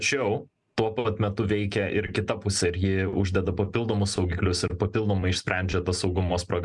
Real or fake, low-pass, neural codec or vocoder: real; 10.8 kHz; none